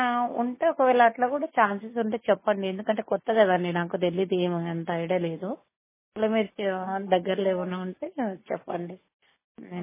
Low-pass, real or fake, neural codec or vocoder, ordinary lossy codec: 3.6 kHz; real; none; MP3, 16 kbps